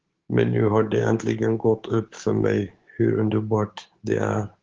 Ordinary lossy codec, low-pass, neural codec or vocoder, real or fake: Opus, 16 kbps; 7.2 kHz; codec, 16 kHz, 6 kbps, DAC; fake